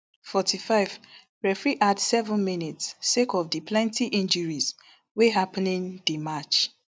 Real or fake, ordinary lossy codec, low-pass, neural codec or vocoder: real; none; none; none